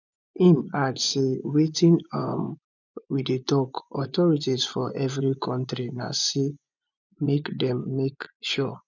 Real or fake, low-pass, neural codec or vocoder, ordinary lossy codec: fake; 7.2 kHz; vocoder, 24 kHz, 100 mel bands, Vocos; none